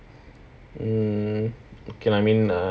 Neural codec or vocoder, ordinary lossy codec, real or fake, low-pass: none; none; real; none